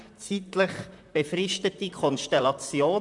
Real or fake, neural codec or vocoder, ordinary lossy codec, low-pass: fake; vocoder, 44.1 kHz, 128 mel bands, Pupu-Vocoder; none; 10.8 kHz